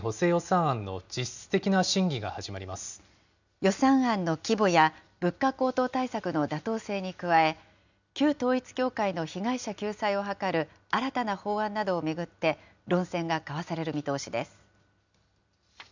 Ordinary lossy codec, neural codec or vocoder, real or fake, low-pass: none; none; real; 7.2 kHz